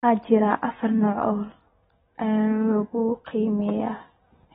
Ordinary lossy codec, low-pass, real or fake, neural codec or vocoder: AAC, 16 kbps; 10.8 kHz; real; none